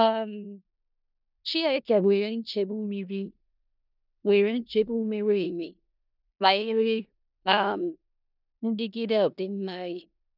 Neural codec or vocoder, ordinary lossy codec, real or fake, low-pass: codec, 16 kHz in and 24 kHz out, 0.4 kbps, LongCat-Audio-Codec, four codebook decoder; none; fake; 5.4 kHz